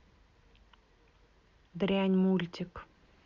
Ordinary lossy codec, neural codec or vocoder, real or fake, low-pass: Opus, 64 kbps; none; real; 7.2 kHz